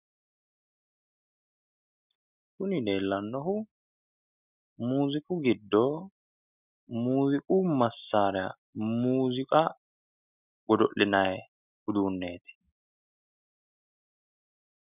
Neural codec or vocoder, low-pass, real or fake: none; 3.6 kHz; real